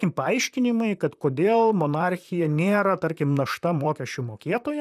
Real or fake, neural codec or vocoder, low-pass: fake; vocoder, 44.1 kHz, 128 mel bands, Pupu-Vocoder; 14.4 kHz